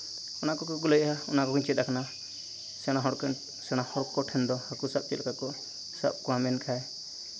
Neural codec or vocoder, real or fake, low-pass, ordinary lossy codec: none; real; none; none